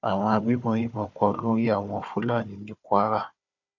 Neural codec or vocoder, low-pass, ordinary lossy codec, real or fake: codec, 16 kHz, 4 kbps, FunCodec, trained on Chinese and English, 50 frames a second; 7.2 kHz; none; fake